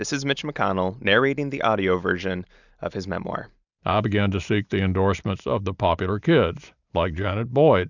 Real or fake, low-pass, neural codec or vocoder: real; 7.2 kHz; none